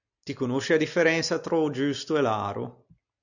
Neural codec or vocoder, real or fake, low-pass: none; real; 7.2 kHz